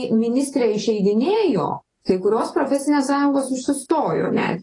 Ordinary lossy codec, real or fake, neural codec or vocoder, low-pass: AAC, 32 kbps; fake; vocoder, 24 kHz, 100 mel bands, Vocos; 10.8 kHz